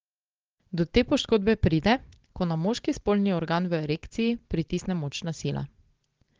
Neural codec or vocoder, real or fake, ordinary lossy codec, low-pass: none; real; Opus, 16 kbps; 7.2 kHz